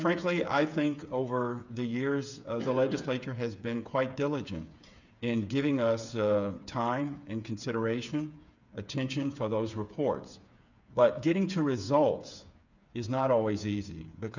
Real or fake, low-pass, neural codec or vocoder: fake; 7.2 kHz; codec, 16 kHz, 8 kbps, FreqCodec, smaller model